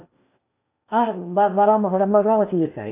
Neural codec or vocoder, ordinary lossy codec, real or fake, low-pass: codec, 16 kHz in and 24 kHz out, 0.8 kbps, FocalCodec, streaming, 65536 codes; AAC, 24 kbps; fake; 3.6 kHz